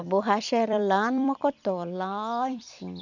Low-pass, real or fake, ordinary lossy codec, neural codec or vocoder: 7.2 kHz; fake; none; vocoder, 44.1 kHz, 128 mel bands every 256 samples, BigVGAN v2